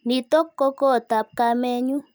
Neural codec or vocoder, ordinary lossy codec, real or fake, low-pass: none; none; real; none